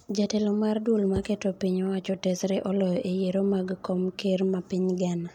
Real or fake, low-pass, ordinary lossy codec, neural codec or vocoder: real; 19.8 kHz; MP3, 96 kbps; none